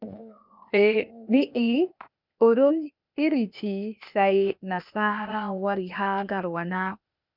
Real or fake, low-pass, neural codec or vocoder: fake; 5.4 kHz; codec, 16 kHz, 0.8 kbps, ZipCodec